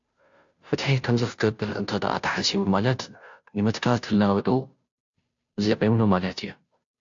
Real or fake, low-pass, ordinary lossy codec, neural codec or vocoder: fake; 7.2 kHz; AAC, 64 kbps; codec, 16 kHz, 0.5 kbps, FunCodec, trained on Chinese and English, 25 frames a second